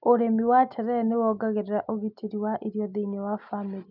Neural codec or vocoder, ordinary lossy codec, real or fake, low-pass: none; none; real; 5.4 kHz